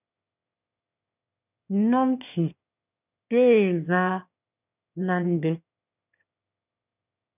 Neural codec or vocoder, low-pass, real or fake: autoencoder, 22.05 kHz, a latent of 192 numbers a frame, VITS, trained on one speaker; 3.6 kHz; fake